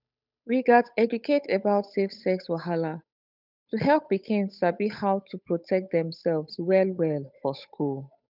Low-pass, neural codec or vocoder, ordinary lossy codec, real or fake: 5.4 kHz; codec, 16 kHz, 8 kbps, FunCodec, trained on Chinese and English, 25 frames a second; none; fake